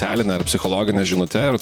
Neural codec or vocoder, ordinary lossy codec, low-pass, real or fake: vocoder, 44.1 kHz, 128 mel bands, Pupu-Vocoder; MP3, 96 kbps; 19.8 kHz; fake